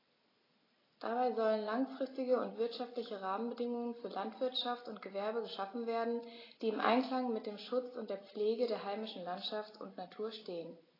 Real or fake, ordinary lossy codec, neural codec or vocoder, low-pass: real; AAC, 24 kbps; none; 5.4 kHz